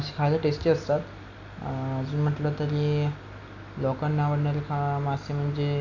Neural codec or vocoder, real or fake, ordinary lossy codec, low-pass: none; real; none; 7.2 kHz